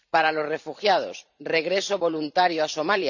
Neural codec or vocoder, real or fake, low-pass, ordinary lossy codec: vocoder, 44.1 kHz, 128 mel bands every 512 samples, BigVGAN v2; fake; 7.2 kHz; none